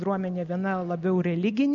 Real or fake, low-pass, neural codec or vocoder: real; 7.2 kHz; none